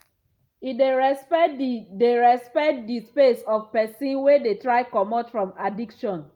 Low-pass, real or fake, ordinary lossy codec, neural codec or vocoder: 19.8 kHz; real; Opus, 32 kbps; none